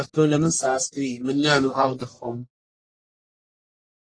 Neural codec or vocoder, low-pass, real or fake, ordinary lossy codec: codec, 44.1 kHz, 1.7 kbps, Pupu-Codec; 9.9 kHz; fake; AAC, 32 kbps